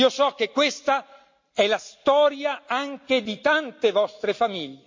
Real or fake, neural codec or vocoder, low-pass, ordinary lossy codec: real; none; 7.2 kHz; MP3, 48 kbps